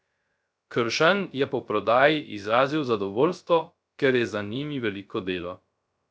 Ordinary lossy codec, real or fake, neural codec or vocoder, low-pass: none; fake; codec, 16 kHz, 0.3 kbps, FocalCodec; none